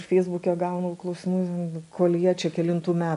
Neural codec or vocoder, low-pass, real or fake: none; 10.8 kHz; real